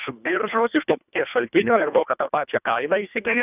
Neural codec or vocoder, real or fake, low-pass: codec, 24 kHz, 1.5 kbps, HILCodec; fake; 3.6 kHz